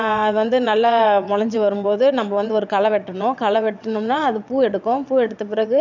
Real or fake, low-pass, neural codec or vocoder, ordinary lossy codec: fake; 7.2 kHz; vocoder, 22.05 kHz, 80 mel bands, WaveNeXt; none